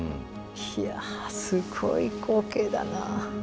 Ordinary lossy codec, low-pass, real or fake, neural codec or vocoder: none; none; real; none